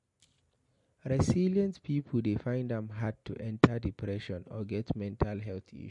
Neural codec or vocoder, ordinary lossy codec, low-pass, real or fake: none; MP3, 48 kbps; 10.8 kHz; real